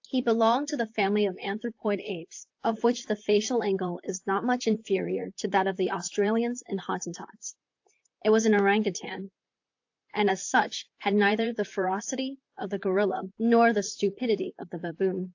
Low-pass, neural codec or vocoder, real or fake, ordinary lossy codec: 7.2 kHz; vocoder, 44.1 kHz, 128 mel bands, Pupu-Vocoder; fake; AAC, 48 kbps